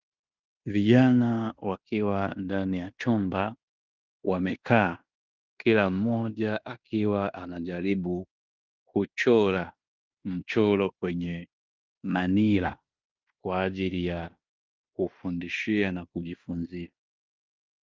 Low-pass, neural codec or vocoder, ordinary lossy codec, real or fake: 7.2 kHz; codec, 16 kHz in and 24 kHz out, 0.9 kbps, LongCat-Audio-Codec, fine tuned four codebook decoder; Opus, 24 kbps; fake